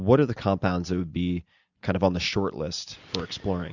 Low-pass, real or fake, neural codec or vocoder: 7.2 kHz; real; none